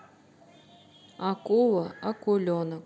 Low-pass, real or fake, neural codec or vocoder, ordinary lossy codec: none; real; none; none